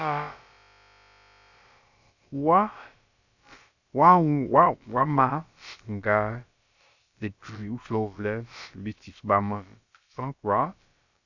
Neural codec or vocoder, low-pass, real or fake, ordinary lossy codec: codec, 16 kHz, about 1 kbps, DyCAST, with the encoder's durations; 7.2 kHz; fake; Opus, 64 kbps